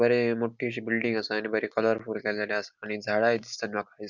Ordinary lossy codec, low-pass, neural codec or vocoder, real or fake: none; 7.2 kHz; none; real